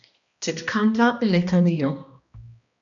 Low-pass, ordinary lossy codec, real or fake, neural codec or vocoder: 7.2 kHz; MP3, 96 kbps; fake; codec, 16 kHz, 1 kbps, X-Codec, HuBERT features, trained on balanced general audio